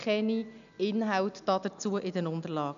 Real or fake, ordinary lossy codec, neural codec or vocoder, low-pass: real; none; none; 7.2 kHz